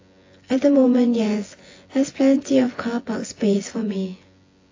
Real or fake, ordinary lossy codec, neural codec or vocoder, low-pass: fake; AAC, 32 kbps; vocoder, 24 kHz, 100 mel bands, Vocos; 7.2 kHz